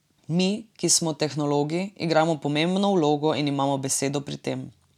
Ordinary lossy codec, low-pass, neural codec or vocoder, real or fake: none; 19.8 kHz; none; real